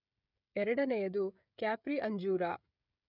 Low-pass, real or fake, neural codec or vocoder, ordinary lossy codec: 5.4 kHz; fake; codec, 16 kHz, 16 kbps, FreqCodec, smaller model; none